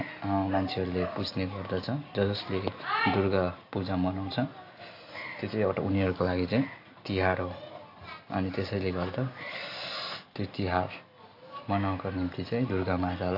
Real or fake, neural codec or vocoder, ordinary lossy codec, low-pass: real; none; AAC, 32 kbps; 5.4 kHz